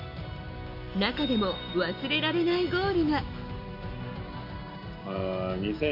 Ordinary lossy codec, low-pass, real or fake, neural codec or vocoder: AAC, 48 kbps; 5.4 kHz; real; none